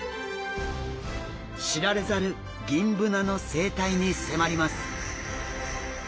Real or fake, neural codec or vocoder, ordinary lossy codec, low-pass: real; none; none; none